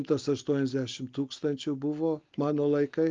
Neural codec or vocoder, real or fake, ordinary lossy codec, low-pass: none; real; Opus, 32 kbps; 7.2 kHz